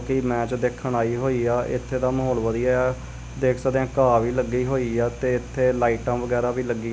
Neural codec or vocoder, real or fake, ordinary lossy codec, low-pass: none; real; none; none